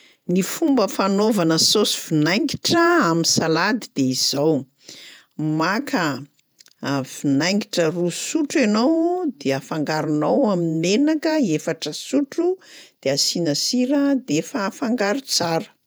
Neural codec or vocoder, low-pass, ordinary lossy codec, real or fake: vocoder, 48 kHz, 128 mel bands, Vocos; none; none; fake